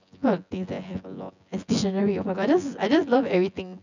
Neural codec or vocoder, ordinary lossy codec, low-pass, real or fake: vocoder, 24 kHz, 100 mel bands, Vocos; none; 7.2 kHz; fake